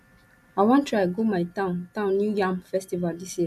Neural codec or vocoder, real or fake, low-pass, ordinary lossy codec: none; real; 14.4 kHz; none